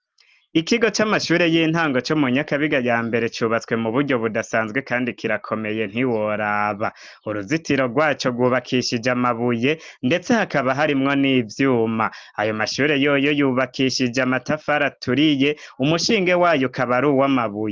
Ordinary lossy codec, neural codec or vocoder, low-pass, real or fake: Opus, 24 kbps; none; 7.2 kHz; real